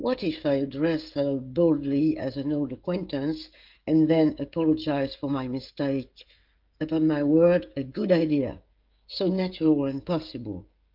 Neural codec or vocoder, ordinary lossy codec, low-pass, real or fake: codec, 16 kHz, 16 kbps, FreqCodec, smaller model; Opus, 24 kbps; 5.4 kHz; fake